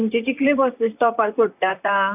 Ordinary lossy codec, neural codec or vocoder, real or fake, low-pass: none; vocoder, 44.1 kHz, 128 mel bands, Pupu-Vocoder; fake; 3.6 kHz